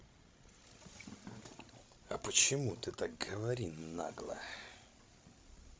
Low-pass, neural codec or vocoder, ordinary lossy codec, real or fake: none; codec, 16 kHz, 16 kbps, FreqCodec, larger model; none; fake